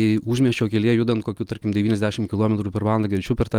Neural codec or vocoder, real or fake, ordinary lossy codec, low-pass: none; real; Opus, 32 kbps; 19.8 kHz